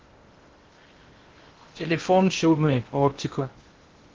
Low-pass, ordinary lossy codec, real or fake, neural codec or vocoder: 7.2 kHz; Opus, 16 kbps; fake; codec, 16 kHz in and 24 kHz out, 0.6 kbps, FocalCodec, streaming, 2048 codes